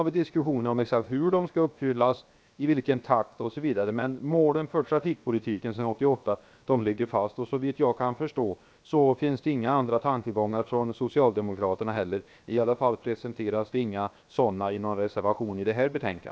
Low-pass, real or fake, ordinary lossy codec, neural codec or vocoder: none; fake; none; codec, 16 kHz, 0.7 kbps, FocalCodec